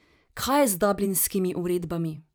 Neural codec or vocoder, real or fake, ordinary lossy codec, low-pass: vocoder, 44.1 kHz, 128 mel bands every 512 samples, BigVGAN v2; fake; none; none